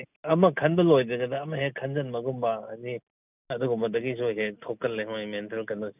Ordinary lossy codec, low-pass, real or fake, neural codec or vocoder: none; 3.6 kHz; real; none